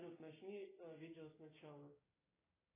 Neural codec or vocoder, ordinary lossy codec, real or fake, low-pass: codec, 16 kHz, 6 kbps, DAC; AAC, 16 kbps; fake; 3.6 kHz